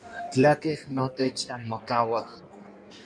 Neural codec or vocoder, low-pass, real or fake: codec, 16 kHz in and 24 kHz out, 1.1 kbps, FireRedTTS-2 codec; 9.9 kHz; fake